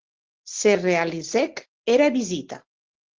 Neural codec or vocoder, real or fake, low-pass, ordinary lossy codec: none; real; 7.2 kHz; Opus, 16 kbps